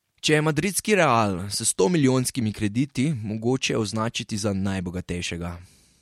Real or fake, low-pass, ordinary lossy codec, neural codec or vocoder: real; 19.8 kHz; MP3, 64 kbps; none